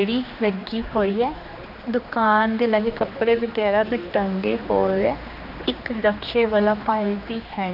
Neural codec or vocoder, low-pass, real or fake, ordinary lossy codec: codec, 16 kHz, 2 kbps, X-Codec, HuBERT features, trained on general audio; 5.4 kHz; fake; none